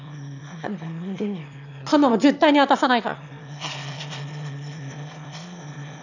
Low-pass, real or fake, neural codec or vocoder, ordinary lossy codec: 7.2 kHz; fake; autoencoder, 22.05 kHz, a latent of 192 numbers a frame, VITS, trained on one speaker; none